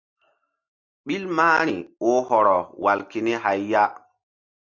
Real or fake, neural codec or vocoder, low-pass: real; none; 7.2 kHz